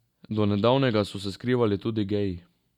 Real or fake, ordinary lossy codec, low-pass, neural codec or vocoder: real; none; 19.8 kHz; none